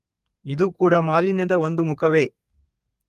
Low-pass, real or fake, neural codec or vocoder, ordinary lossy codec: 14.4 kHz; fake; codec, 32 kHz, 1.9 kbps, SNAC; Opus, 32 kbps